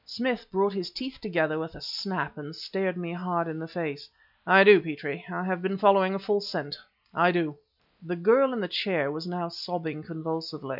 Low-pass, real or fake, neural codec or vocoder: 5.4 kHz; real; none